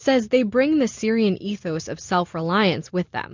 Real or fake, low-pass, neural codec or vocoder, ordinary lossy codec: real; 7.2 kHz; none; MP3, 64 kbps